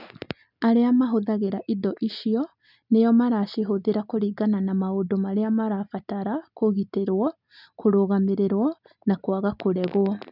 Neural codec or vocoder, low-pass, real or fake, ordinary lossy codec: none; 5.4 kHz; real; none